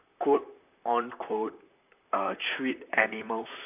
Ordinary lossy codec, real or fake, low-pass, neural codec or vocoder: none; fake; 3.6 kHz; vocoder, 44.1 kHz, 128 mel bands, Pupu-Vocoder